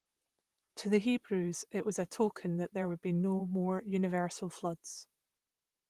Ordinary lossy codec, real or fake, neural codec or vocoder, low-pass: Opus, 24 kbps; fake; vocoder, 44.1 kHz, 128 mel bands, Pupu-Vocoder; 14.4 kHz